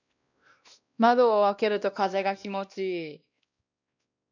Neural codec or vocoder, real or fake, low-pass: codec, 16 kHz, 1 kbps, X-Codec, WavLM features, trained on Multilingual LibriSpeech; fake; 7.2 kHz